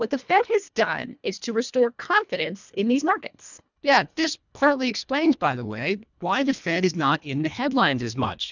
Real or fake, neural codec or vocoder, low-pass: fake; codec, 24 kHz, 1.5 kbps, HILCodec; 7.2 kHz